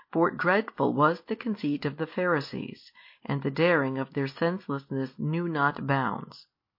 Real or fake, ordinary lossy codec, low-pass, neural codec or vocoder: real; MP3, 32 kbps; 5.4 kHz; none